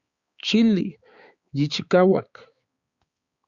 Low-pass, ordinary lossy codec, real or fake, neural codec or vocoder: 7.2 kHz; Opus, 64 kbps; fake; codec, 16 kHz, 4 kbps, X-Codec, HuBERT features, trained on balanced general audio